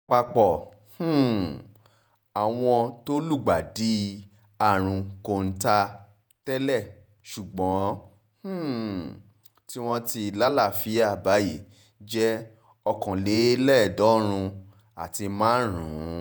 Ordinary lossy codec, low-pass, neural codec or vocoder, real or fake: none; none; vocoder, 48 kHz, 128 mel bands, Vocos; fake